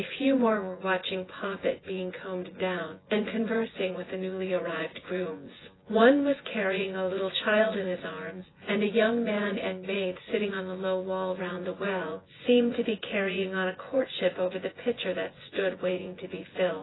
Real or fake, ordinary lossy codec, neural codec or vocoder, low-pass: fake; AAC, 16 kbps; vocoder, 24 kHz, 100 mel bands, Vocos; 7.2 kHz